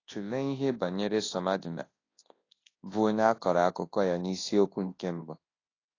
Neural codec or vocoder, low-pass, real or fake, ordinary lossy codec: codec, 24 kHz, 0.9 kbps, WavTokenizer, large speech release; 7.2 kHz; fake; AAC, 32 kbps